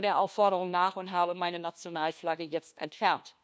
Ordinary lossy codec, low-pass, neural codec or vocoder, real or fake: none; none; codec, 16 kHz, 1 kbps, FunCodec, trained on LibriTTS, 50 frames a second; fake